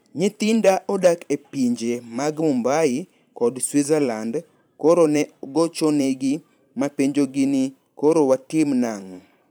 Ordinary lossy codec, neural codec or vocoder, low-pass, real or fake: none; vocoder, 44.1 kHz, 128 mel bands every 512 samples, BigVGAN v2; none; fake